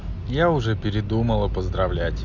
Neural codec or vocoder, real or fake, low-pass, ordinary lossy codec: none; real; 7.2 kHz; none